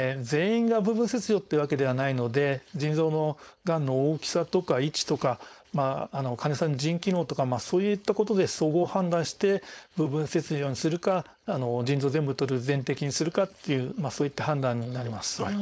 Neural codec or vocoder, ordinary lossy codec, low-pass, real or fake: codec, 16 kHz, 4.8 kbps, FACodec; none; none; fake